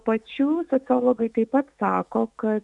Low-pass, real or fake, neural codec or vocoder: 10.8 kHz; fake; autoencoder, 48 kHz, 128 numbers a frame, DAC-VAE, trained on Japanese speech